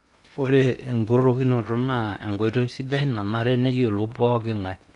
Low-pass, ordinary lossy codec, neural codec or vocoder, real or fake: 10.8 kHz; none; codec, 16 kHz in and 24 kHz out, 0.8 kbps, FocalCodec, streaming, 65536 codes; fake